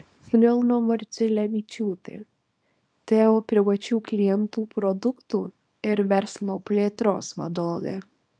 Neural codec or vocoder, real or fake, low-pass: codec, 24 kHz, 0.9 kbps, WavTokenizer, small release; fake; 9.9 kHz